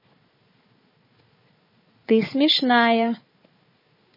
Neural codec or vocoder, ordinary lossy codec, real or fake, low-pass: codec, 16 kHz, 4 kbps, FunCodec, trained on Chinese and English, 50 frames a second; MP3, 24 kbps; fake; 5.4 kHz